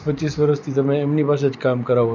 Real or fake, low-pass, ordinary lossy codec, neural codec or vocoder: real; 7.2 kHz; none; none